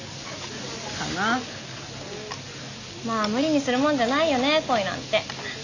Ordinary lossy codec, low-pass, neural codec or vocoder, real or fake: none; 7.2 kHz; none; real